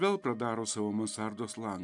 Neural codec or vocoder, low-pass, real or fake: none; 10.8 kHz; real